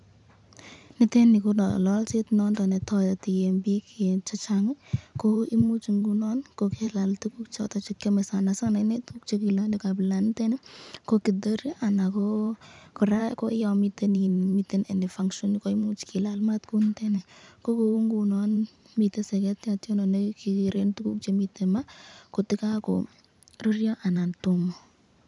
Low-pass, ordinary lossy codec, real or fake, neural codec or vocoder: 10.8 kHz; none; fake; vocoder, 44.1 kHz, 128 mel bands every 512 samples, BigVGAN v2